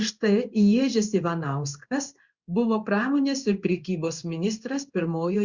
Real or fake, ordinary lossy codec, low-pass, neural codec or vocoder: fake; Opus, 64 kbps; 7.2 kHz; codec, 16 kHz in and 24 kHz out, 1 kbps, XY-Tokenizer